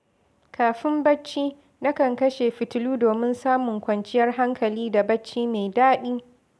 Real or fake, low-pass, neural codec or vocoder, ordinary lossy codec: real; none; none; none